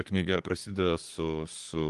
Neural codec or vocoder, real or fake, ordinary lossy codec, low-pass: codec, 24 kHz, 1 kbps, SNAC; fake; Opus, 24 kbps; 10.8 kHz